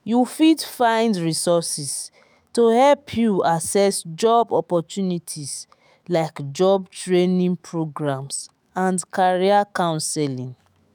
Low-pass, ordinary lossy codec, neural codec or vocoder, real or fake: none; none; autoencoder, 48 kHz, 128 numbers a frame, DAC-VAE, trained on Japanese speech; fake